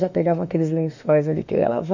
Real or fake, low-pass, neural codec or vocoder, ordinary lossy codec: fake; 7.2 kHz; autoencoder, 48 kHz, 32 numbers a frame, DAC-VAE, trained on Japanese speech; none